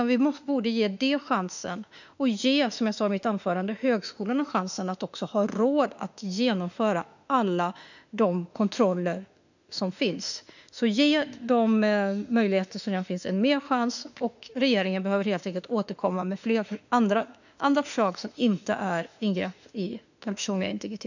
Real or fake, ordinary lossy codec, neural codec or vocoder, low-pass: fake; none; autoencoder, 48 kHz, 32 numbers a frame, DAC-VAE, trained on Japanese speech; 7.2 kHz